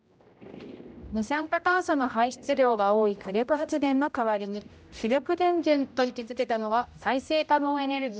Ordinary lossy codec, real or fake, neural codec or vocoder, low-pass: none; fake; codec, 16 kHz, 0.5 kbps, X-Codec, HuBERT features, trained on general audio; none